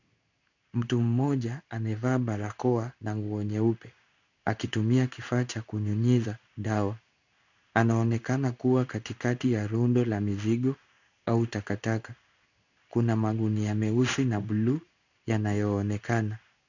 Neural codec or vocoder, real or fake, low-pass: codec, 16 kHz in and 24 kHz out, 1 kbps, XY-Tokenizer; fake; 7.2 kHz